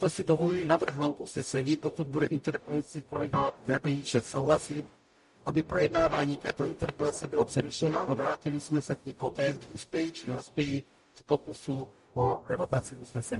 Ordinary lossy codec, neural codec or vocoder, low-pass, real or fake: MP3, 48 kbps; codec, 44.1 kHz, 0.9 kbps, DAC; 14.4 kHz; fake